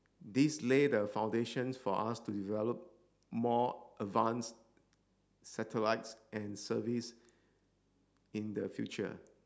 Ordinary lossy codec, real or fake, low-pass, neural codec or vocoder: none; real; none; none